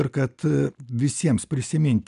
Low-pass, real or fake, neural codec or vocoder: 10.8 kHz; real; none